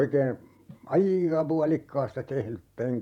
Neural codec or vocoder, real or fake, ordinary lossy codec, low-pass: vocoder, 48 kHz, 128 mel bands, Vocos; fake; none; 19.8 kHz